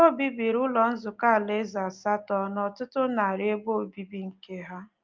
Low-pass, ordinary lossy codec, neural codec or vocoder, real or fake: 7.2 kHz; Opus, 24 kbps; none; real